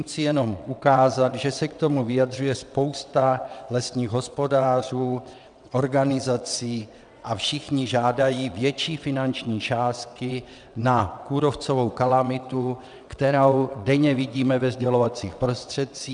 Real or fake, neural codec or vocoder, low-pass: fake; vocoder, 22.05 kHz, 80 mel bands, WaveNeXt; 9.9 kHz